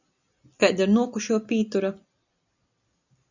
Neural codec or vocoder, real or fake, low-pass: none; real; 7.2 kHz